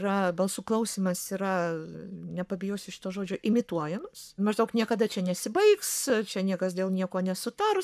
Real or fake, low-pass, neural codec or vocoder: fake; 14.4 kHz; codec, 44.1 kHz, 7.8 kbps, Pupu-Codec